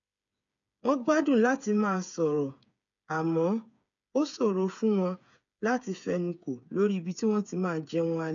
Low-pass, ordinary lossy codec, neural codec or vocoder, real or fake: 7.2 kHz; none; codec, 16 kHz, 8 kbps, FreqCodec, smaller model; fake